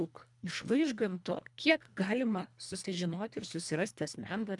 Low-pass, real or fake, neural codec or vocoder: 10.8 kHz; fake; codec, 24 kHz, 1.5 kbps, HILCodec